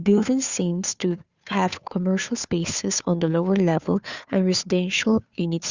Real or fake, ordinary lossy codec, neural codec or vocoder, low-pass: fake; Opus, 64 kbps; codec, 16 kHz, 2 kbps, FunCodec, trained on Chinese and English, 25 frames a second; 7.2 kHz